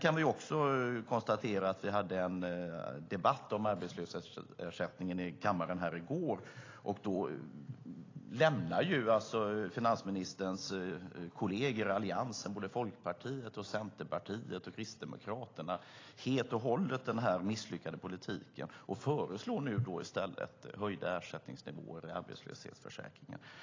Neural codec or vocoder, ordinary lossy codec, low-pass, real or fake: none; AAC, 32 kbps; 7.2 kHz; real